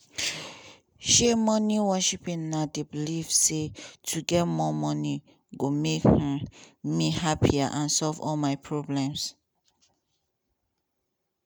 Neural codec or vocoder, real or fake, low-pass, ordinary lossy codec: none; real; none; none